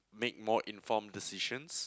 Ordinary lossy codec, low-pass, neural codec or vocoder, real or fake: none; none; none; real